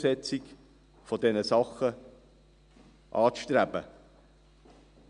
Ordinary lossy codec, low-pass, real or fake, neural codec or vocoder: none; 9.9 kHz; real; none